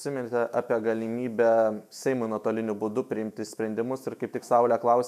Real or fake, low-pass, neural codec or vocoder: fake; 14.4 kHz; autoencoder, 48 kHz, 128 numbers a frame, DAC-VAE, trained on Japanese speech